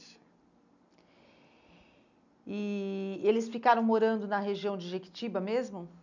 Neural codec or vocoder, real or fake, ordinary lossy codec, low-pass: none; real; none; 7.2 kHz